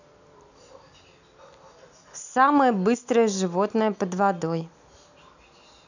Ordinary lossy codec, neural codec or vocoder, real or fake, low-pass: none; none; real; 7.2 kHz